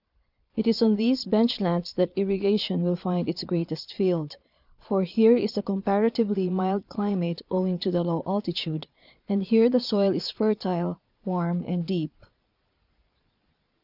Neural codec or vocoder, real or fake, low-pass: vocoder, 22.05 kHz, 80 mel bands, Vocos; fake; 5.4 kHz